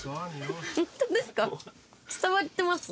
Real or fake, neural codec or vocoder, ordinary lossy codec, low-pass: real; none; none; none